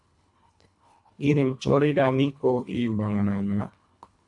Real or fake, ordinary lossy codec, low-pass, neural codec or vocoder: fake; AAC, 64 kbps; 10.8 kHz; codec, 24 kHz, 1.5 kbps, HILCodec